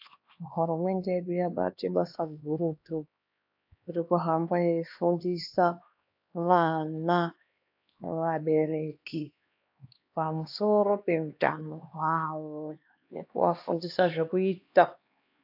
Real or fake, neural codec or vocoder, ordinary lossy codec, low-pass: fake; codec, 16 kHz, 2 kbps, X-Codec, HuBERT features, trained on LibriSpeech; AAC, 48 kbps; 5.4 kHz